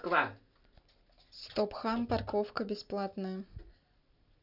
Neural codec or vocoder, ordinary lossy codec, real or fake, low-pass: none; none; real; 5.4 kHz